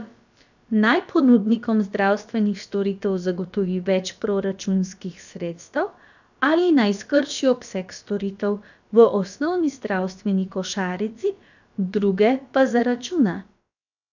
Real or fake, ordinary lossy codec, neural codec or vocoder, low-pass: fake; none; codec, 16 kHz, about 1 kbps, DyCAST, with the encoder's durations; 7.2 kHz